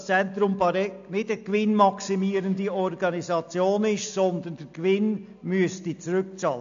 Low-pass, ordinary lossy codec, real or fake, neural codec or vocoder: 7.2 kHz; AAC, 64 kbps; real; none